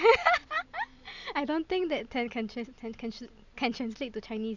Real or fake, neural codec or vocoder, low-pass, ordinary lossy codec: real; none; 7.2 kHz; none